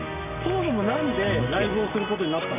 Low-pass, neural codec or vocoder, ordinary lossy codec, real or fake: 3.6 kHz; none; none; real